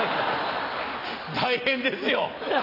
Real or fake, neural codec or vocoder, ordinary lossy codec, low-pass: real; none; none; 5.4 kHz